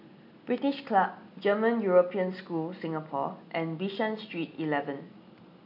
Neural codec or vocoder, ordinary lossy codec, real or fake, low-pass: none; none; real; 5.4 kHz